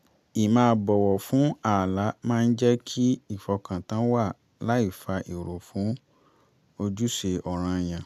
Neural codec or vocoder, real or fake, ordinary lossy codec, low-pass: none; real; none; 14.4 kHz